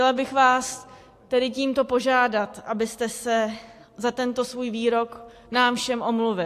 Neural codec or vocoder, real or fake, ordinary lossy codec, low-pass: none; real; AAC, 64 kbps; 14.4 kHz